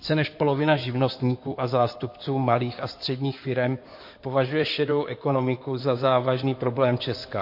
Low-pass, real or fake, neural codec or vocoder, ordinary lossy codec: 5.4 kHz; fake; codec, 16 kHz in and 24 kHz out, 2.2 kbps, FireRedTTS-2 codec; MP3, 32 kbps